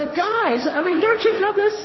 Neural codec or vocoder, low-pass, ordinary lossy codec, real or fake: codec, 16 kHz, 1.1 kbps, Voila-Tokenizer; 7.2 kHz; MP3, 24 kbps; fake